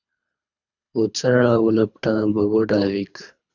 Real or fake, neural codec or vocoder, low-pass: fake; codec, 24 kHz, 3 kbps, HILCodec; 7.2 kHz